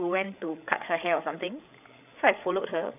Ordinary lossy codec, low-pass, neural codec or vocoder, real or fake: none; 3.6 kHz; codec, 16 kHz, 8 kbps, FreqCodec, larger model; fake